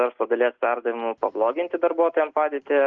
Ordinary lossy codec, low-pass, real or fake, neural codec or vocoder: Opus, 24 kbps; 7.2 kHz; real; none